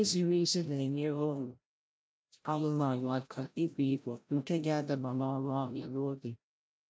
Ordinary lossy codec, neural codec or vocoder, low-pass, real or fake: none; codec, 16 kHz, 0.5 kbps, FreqCodec, larger model; none; fake